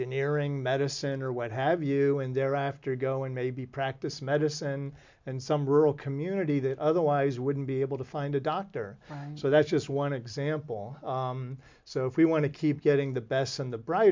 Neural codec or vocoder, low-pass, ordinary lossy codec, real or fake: none; 7.2 kHz; MP3, 64 kbps; real